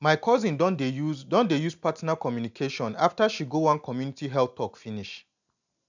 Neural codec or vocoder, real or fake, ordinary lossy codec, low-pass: none; real; none; 7.2 kHz